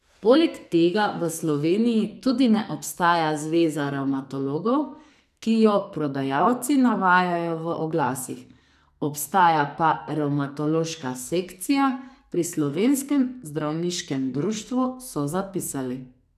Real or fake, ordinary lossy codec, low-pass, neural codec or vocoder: fake; none; 14.4 kHz; codec, 44.1 kHz, 2.6 kbps, SNAC